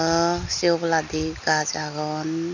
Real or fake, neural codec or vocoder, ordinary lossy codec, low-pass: real; none; none; 7.2 kHz